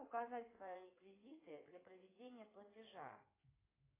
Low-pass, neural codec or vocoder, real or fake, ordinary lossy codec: 3.6 kHz; codec, 16 kHz in and 24 kHz out, 2.2 kbps, FireRedTTS-2 codec; fake; AAC, 16 kbps